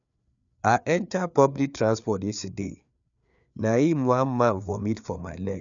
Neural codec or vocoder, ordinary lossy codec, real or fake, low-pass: codec, 16 kHz, 4 kbps, FreqCodec, larger model; none; fake; 7.2 kHz